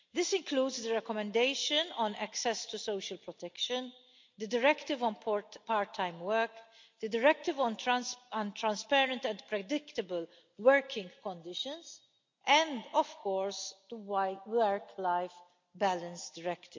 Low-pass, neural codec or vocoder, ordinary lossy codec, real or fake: 7.2 kHz; none; MP3, 64 kbps; real